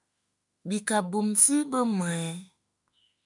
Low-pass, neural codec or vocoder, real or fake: 10.8 kHz; autoencoder, 48 kHz, 32 numbers a frame, DAC-VAE, trained on Japanese speech; fake